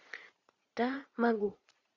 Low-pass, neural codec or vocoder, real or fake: 7.2 kHz; vocoder, 44.1 kHz, 80 mel bands, Vocos; fake